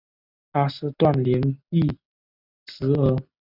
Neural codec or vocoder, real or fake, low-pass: none; real; 5.4 kHz